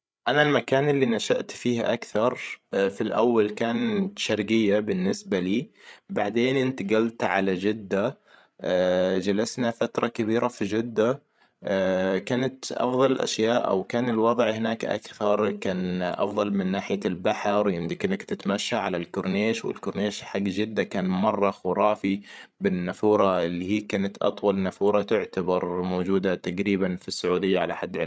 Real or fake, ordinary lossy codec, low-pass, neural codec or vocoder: fake; none; none; codec, 16 kHz, 8 kbps, FreqCodec, larger model